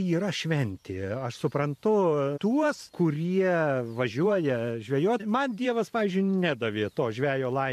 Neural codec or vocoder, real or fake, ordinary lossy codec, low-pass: vocoder, 44.1 kHz, 128 mel bands every 512 samples, BigVGAN v2; fake; MP3, 64 kbps; 14.4 kHz